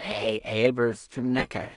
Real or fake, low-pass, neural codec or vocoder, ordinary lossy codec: fake; 10.8 kHz; codec, 16 kHz in and 24 kHz out, 0.4 kbps, LongCat-Audio-Codec, two codebook decoder; none